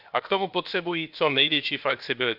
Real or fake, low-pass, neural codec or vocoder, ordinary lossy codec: fake; 5.4 kHz; codec, 16 kHz, about 1 kbps, DyCAST, with the encoder's durations; none